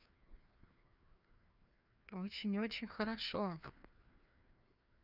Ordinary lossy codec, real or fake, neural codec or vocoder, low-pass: none; fake; codec, 16 kHz, 2 kbps, FreqCodec, larger model; 5.4 kHz